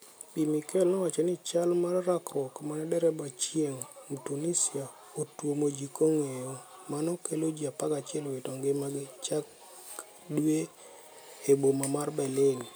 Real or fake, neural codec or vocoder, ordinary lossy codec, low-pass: real; none; none; none